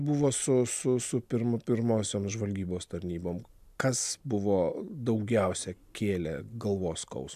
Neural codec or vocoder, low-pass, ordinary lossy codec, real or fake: none; 14.4 kHz; AAC, 96 kbps; real